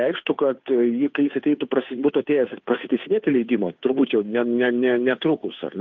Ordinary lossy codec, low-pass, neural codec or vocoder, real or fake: MP3, 64 kbps; 7.2 kHz; codec, 16 kHz, 2 kbps, FunCodec, trained on Chinese and English, 25 frames a second; fake